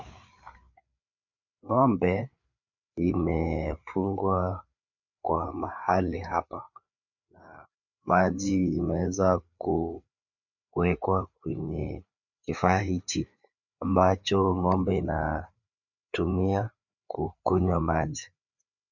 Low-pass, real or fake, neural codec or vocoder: 7.2 kHz; fake; codec, 16 kHz, 4 kbps, FreqCodec, larger model